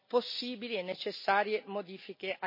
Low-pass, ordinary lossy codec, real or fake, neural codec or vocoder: 5.4 kHz; MP3, 24 kbps; real; none